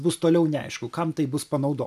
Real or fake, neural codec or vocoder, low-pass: real; none; 14.4 kHz